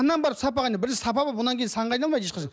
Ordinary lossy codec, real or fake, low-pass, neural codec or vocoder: none; real; none; none